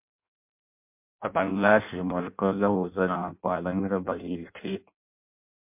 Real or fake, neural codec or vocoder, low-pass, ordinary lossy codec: fake; codec, 16 kHz in and 24 kHz out, 0.6 kbps, FireRedTTS-2 codec; 3.6 kHz; MP3, 32 kbps